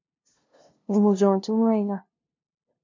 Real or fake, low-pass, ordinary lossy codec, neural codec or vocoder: fake; 7.2 kHz; MP3, 64 kbps; codec, 16 kHz, 0.5 kbps, FunCodec, trained on LibriTTS, 25 frames a second